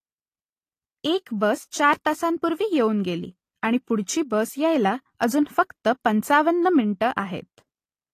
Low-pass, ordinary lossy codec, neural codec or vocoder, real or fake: 14.4 kHz; AAC, 48 kbps; none; real